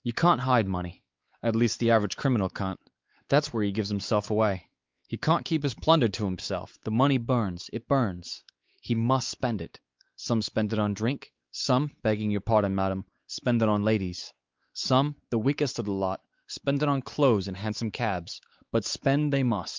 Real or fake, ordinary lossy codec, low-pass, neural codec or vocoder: fake; Opus, 24 kbps; 7.2 kHz; codec, 16 kHz, 4 kbps, X-Codec, WavLM features, trained on Multilingual LibriSpeech